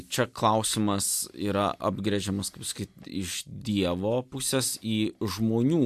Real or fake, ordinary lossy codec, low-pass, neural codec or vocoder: real; AAC, 96 kbps; 14.4 kHz; none